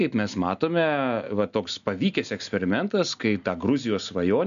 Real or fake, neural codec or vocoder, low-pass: real; none; 7.2 kHz